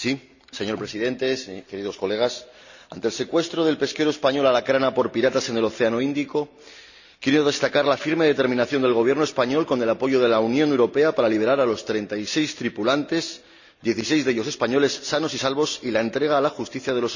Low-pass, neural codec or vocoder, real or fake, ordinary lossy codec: 7.2 kHz; none; real; none